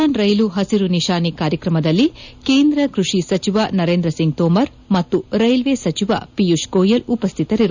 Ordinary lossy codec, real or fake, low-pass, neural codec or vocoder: none; real; 7.2 kHz; none